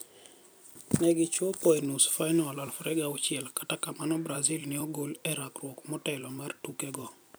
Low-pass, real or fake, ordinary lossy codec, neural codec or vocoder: none; fake; none; vocoder, 44.1 kHz, 128 mel bands every 256 samples, BigVGAN v2